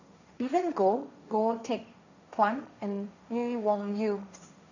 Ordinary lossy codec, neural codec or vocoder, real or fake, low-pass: none; codec, 16 kHz, 1.1 kbps, Voila-Tokenizer; fake; 7.2 kHz